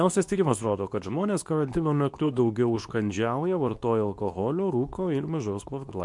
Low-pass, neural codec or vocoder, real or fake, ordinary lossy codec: 10.8 kHz; codec, 24 kHz, 0.9 kbps, WavTokenizer, medium speech release version 2; fake; MP3, 64 kbps